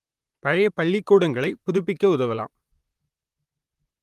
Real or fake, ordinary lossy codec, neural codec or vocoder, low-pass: fake; Opus, 32 kbps; vocoder, 44.1 kHz, 128 mel bands, Pupu-Vocoder; 14.4 kHz